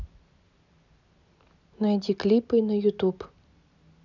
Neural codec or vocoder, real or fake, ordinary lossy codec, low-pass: none; real; none; 7.2 kHz